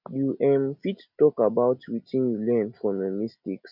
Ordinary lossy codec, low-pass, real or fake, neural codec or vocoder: none; 5.4 kHz; real; none